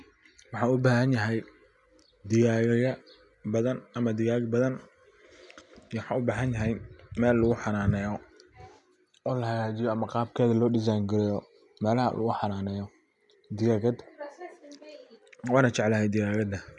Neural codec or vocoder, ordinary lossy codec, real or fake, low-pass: none; none; real; 10.8 kHz